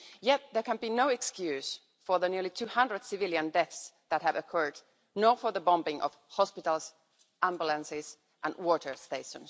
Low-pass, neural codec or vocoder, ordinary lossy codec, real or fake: none; none; none; real